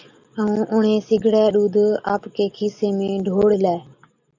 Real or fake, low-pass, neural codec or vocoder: real; 7.2 kHz; none